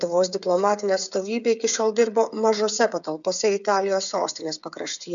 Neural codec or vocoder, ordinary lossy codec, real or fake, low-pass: codec, 16 kHz, 8 kbps, FreqCodec, smaller model; MP3, 96 kbps; fake; 7.2 kHz